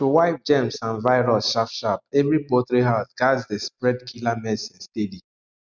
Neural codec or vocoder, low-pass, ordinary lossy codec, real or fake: none; 7.2 kHz; none; real